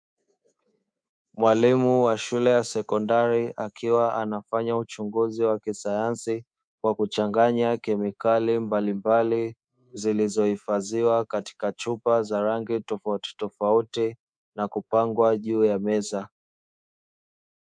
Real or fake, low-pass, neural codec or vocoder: fake; 9.9 kHz; codec, 24 kHz, 3.1 kbps, DualCodec